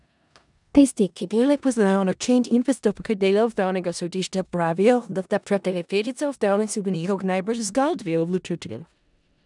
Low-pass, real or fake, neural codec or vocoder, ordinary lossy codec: 10.8 kHz; fake; codec, 16 kHz in and 24 kHz out, 0.4 kbps, LongCat-Audio-Codec, four codebook decoder; none